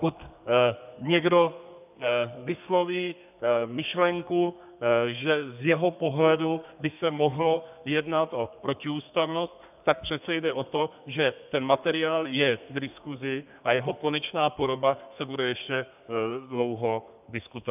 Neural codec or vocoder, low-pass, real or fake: codec, 32 kHz, 1.9 kbps, SNAC; 3.6 kHz; fake